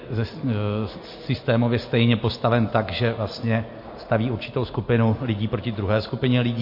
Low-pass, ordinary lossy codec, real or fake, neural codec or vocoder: 5.4 kHz; MP3, 32 kbps; real; none